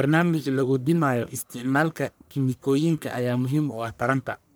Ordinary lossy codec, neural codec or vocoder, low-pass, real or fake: none; codec, 44.1 kHz, 1.7 kbps, Pupu-Codec; none; fake